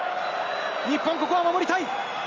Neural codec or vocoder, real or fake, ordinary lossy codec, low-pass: none; real; Opus, 32 kbps; 7.2 kHz